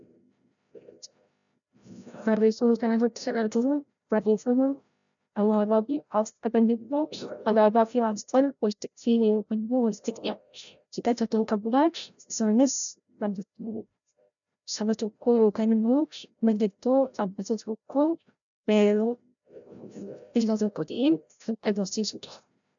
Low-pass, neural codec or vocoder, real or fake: 7.2 kHz; codec, 16 kHz, 0.5 kbps, FreqCodec, larger model; fake